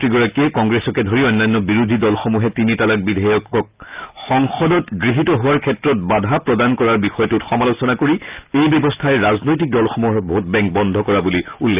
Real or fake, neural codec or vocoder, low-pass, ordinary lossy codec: real; none; 3.6 kHz; Opus, 24 kbps